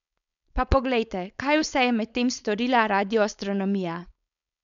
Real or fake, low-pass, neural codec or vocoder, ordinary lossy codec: fake; 7.2 kHz; codec, 16 kHz, 4.8 kbps, FACodec; none